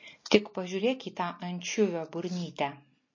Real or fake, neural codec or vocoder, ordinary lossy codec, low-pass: real; none; MP3, 32 kbps; 7.2 kHz